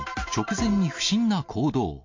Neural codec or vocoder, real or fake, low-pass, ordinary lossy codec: none; real; 7.2 kHz; MP3, 48 kbps